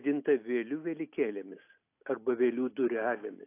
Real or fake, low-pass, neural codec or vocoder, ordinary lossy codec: real; 3.6 kHz; none; AAC, 24 kbps